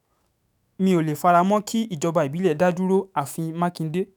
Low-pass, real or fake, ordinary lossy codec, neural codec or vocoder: none; fake; none; autoencoder, 48 kHz, 128 numbers a frame, DAC-VAE, trained on Japanese speech